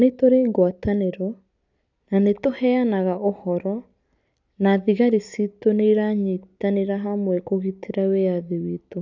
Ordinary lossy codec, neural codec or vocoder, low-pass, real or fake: none; none; 7.2 kHz; real